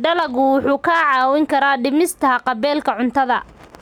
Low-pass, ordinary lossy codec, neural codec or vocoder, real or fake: 19.8 kHz; none; none; real